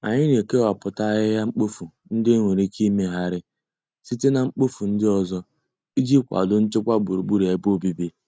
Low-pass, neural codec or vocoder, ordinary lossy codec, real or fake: none; none; none; real